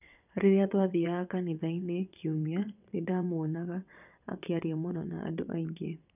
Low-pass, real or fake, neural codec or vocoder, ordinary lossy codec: 3.6 kHz; fake; vocoder, 22.05 kHz, 80 mel bands, WaveNeXt; none